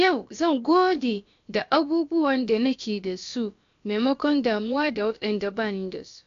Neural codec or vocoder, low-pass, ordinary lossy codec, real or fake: codec, 16 kHz, about 1 kbps, DyCAST, with the encoder's durations; 7.2 kHz; AAC, 64 kbps; fake